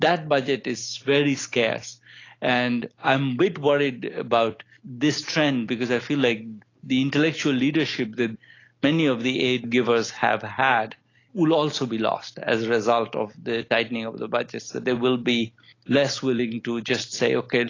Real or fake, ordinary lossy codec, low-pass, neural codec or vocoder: real; AAC, 32 kbps; 7.2 kHz; none